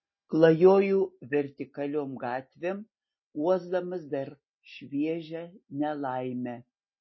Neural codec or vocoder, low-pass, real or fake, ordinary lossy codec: none; 7.2 kHz; real; MP3, 24 kbps